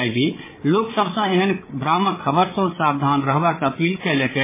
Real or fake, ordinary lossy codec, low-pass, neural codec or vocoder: fake; MP3, 16 kbps; 3.6 kHz; codec, 16 kHz, 8 kbps, FreqCodec, larger model